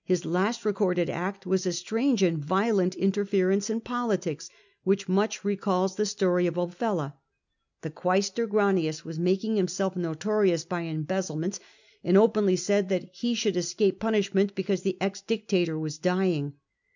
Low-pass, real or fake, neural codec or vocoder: 7.2 kHz; real; none